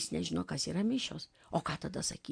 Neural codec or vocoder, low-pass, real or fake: none; 9.9 kHz; real